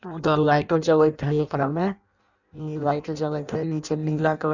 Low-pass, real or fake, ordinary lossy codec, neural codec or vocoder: 7.2 kHz; fake; none; codec, 16 kHz in and 24 kHz out, 0.6 kbps, FireRedTTS-2 codec